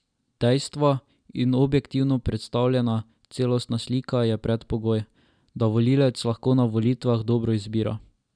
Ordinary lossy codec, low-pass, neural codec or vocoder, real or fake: none; 9.9 kHz; none; real